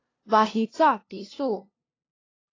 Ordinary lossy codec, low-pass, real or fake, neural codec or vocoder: AAC, 32 kbps; 7.2 kHz; fake; codec, 16 kHz, 0.5 kbps, FunCodec, trained on LibriTTS, 25 frames a second